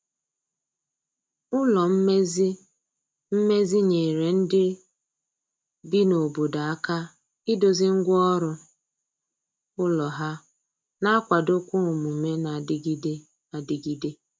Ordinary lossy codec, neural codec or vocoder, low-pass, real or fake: none; none; none; real